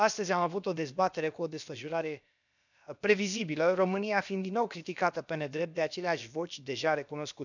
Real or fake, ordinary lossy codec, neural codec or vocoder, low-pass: fake; none; codec, 16 kHz, about 1 kbps, DyCAST, with the encoder's durations; 7.2 kHz